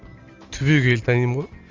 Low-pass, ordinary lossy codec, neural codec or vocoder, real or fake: 7.2 kHz; Opus, 32 kbps; none; real